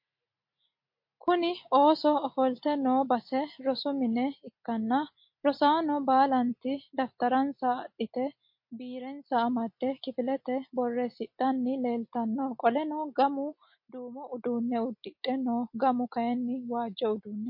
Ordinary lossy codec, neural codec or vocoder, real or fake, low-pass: MP3, 32 kbps; none; real; 5.4 kHz